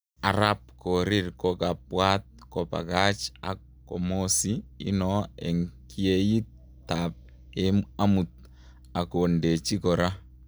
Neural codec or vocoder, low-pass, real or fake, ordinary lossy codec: none; none; real; none